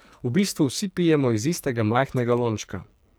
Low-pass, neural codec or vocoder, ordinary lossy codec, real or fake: none; codec, 44.1 kHz, 2.6 kbps, SNAC; none; fake